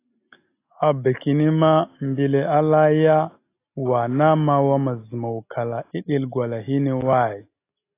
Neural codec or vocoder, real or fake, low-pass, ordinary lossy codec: none; real; 3.6 kHz; AAC, 24 kbps